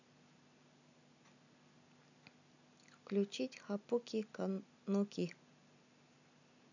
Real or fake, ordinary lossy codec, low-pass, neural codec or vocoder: real; none; 7.2 kHz; none